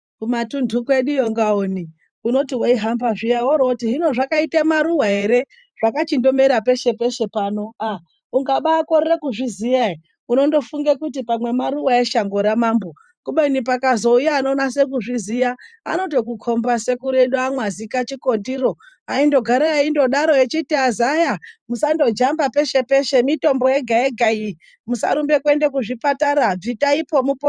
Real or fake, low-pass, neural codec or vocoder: fake; 9.9 kHz; vocoder, 44.1 kHz, 128 mel bands every 512 samples, BigVGAN v2